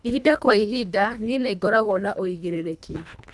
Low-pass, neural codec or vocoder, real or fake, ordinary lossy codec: none; codec, 24 kHz, 1.5 kbps, HILCodec; fake; none